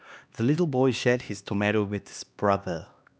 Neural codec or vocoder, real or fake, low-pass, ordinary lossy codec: codec, 16 kHz, 2 kbps, X-Codec, HuBERT features, trained on LibriSpeech; fake; none; none